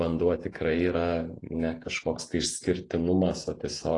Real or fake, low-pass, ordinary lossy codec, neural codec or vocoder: fake; 10.8 kHz; AAC, 32 kbps; vocoder, 48 kHz, 128 mel bands, Vocos